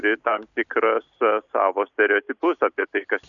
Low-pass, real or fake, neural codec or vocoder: 7.2 kHz; real; none